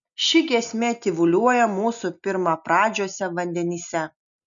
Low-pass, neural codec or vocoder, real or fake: 7.2 kHz; none; real